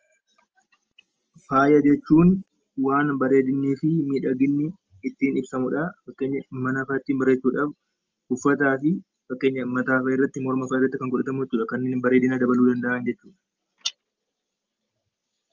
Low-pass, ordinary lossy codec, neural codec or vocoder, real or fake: 7.2 kHz; Opus, 24 kbps; none; real